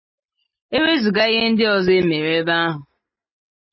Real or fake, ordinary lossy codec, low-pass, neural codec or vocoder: real; MP3, 24 kbps; 7.2 kHz; none